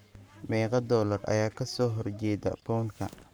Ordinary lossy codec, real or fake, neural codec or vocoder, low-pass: none; fake; codec, 44.1 kHz, 7.8 kbps, Pupu-Codec; none